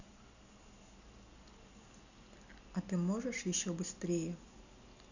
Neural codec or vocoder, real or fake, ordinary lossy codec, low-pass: none; real; none; 7.2 kHz